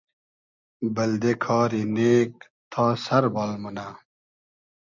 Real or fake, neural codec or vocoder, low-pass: real; none; 7.2 kHz